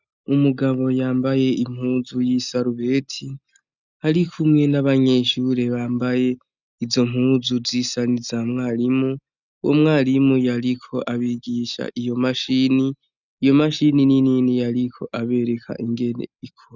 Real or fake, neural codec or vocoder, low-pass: real; none; 7.2 kHz